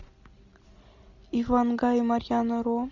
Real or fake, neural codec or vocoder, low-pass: real; none; 7.2 kHz